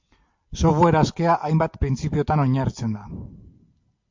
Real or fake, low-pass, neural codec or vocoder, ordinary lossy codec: real; 7.2 kHz; none; MP3, 48 kbps